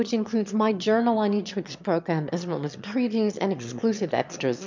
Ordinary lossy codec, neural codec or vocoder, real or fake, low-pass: MP3, 64 kbps; autoencoder, 22.05 kHz, a latent of 192 numbers a frame, VITS, trained on one speaker; fake; 7.2 kHz